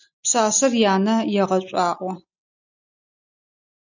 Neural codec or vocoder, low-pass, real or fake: none; 7.2 kHz; real